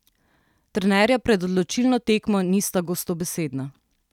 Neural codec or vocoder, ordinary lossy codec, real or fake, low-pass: none; none; real; 19.8 kHz